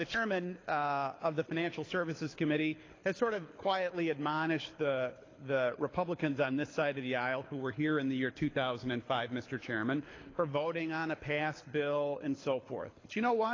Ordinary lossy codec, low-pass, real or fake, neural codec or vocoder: AAC, 32 kbps; 7.2 kHz; fake; codec, 24 kHz, 6 kbps, HILCodec